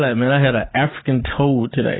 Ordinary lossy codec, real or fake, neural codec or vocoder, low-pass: AAC, 16 kbps; real; none; 7.2 kHz